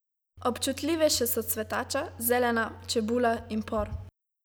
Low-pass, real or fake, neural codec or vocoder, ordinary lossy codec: none; real; none; none